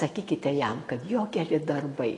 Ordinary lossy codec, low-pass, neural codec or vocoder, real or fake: AAC, 32 kbps; 10.8 kHz; none; real